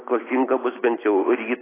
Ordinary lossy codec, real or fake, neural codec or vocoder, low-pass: AAC, 16 kbps; real; none; 3.6 kHz